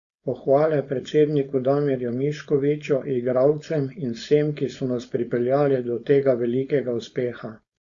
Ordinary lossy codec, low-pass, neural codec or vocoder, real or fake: Opus, 64 kbps; 7.2 kHz; codec, 16 kHz, 4.8 kbps, FACodec; fake